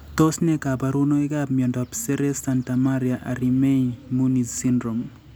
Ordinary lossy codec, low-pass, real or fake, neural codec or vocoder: none; none; real; none